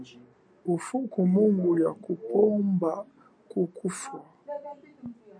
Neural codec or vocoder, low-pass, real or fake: none; 9.9 kHz; real